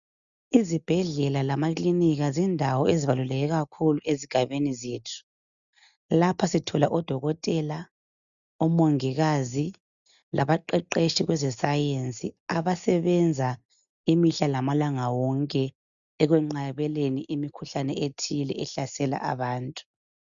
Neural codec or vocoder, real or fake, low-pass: none; real; 7.2 kHz